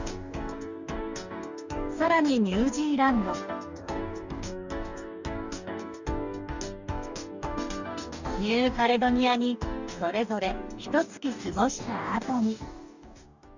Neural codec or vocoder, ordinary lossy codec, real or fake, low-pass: codec, 44.1 kHz, 2.6 kbps, DAC; none; fake; 7.2 kHz